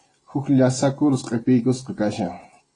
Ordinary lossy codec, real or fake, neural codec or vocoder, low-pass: AAC, 32 kbps; real; none; 9.9 kHz